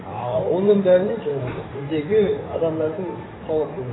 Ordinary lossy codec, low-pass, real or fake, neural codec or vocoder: AAC, 16 kbps; 7.2 kHz; fake; codec, 16 kHz in and 24 kHz out, 2.2 kbps, FireRedTTS-2 codec